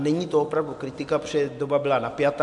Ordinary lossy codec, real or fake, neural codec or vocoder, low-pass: MP3, 64 kbps; real; none; 10.8 kHz